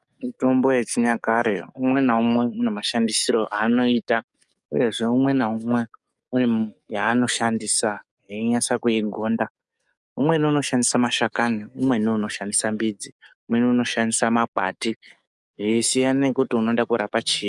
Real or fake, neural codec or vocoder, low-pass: fake; codec, 44.1 kHz, 7.8 kbps, DAC; 10.8 kHz